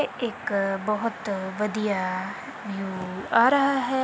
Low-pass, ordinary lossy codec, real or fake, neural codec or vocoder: none; none; real; none